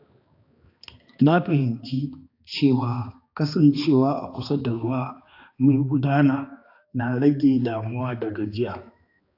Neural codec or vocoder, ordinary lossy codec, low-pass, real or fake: codec, 16 kHz, 2 kbps, X-Codec, HuBERT features, trained on balanced general audio; AAC, 32 kbps; 5.4 kHz; fake